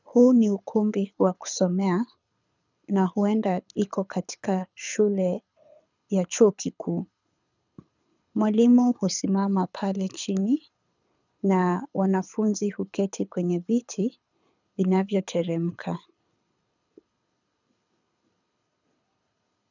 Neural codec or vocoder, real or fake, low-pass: codec, 24 kHz, 6 kbps, HILCodec; fake; 7.2 kHz